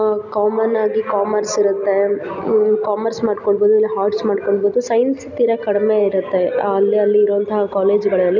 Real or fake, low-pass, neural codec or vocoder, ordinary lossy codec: real; 7.2 kHz; none; none